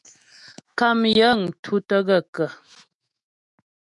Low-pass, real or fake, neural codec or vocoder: 10.8 kHz; fake; autoencoder, 48 kHz, 128 numbers a frame, DAC-VAE, trained on Japanese speech